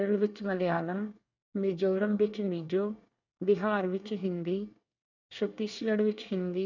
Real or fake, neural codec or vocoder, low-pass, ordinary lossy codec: fake; codec, 24 kHz, 1 kbps, SNAC; 7.2 kHz; none